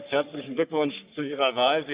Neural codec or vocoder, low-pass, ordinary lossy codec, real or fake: codec, 44.1 kHz, 3.4 kbps, Pupu-Codec; 3.6 kHz; Opus, 64 kbps; fake